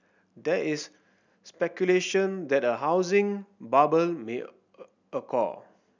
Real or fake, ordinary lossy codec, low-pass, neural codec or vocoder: real; none; 7.2 kHz; none